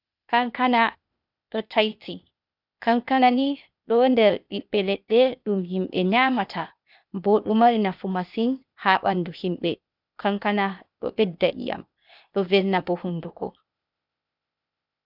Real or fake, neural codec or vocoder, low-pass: fake; codec, 16 kHz, 0.8 kbps, ZipCodec; 5.4 kHz